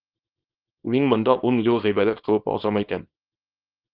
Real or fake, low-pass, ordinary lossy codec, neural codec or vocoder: fake; 5.4 kHz; Opus, 32 kbps; codec, 24 kHz, 0.9 kbps, WavTokenizer, small release